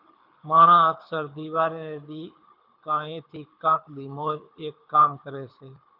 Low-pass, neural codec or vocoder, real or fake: 5.4 kHz; codec, 24 kHz, 6 kbps, HILCodec; fake